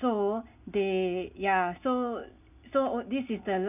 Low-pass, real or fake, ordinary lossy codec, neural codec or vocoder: 3.6 kHz; real; none; none